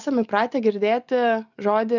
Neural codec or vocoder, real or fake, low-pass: none; real; 7.2 kHz